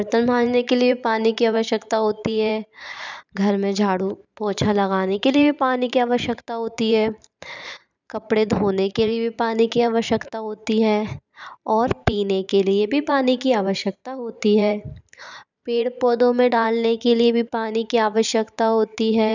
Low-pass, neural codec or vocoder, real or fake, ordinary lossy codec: 7.2 kHz; vocoder, 44.1 kHz, 128 mel bands every 512 samples, BigVGAN v2; fake; none